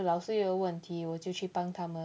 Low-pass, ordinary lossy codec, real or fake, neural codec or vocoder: none; none; real; none